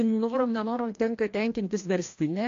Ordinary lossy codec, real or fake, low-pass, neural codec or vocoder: AAC, 48 kbps; fake; 7.2 kHz; codec, 16 kHz, 1 kbps, FreqCodec, larger model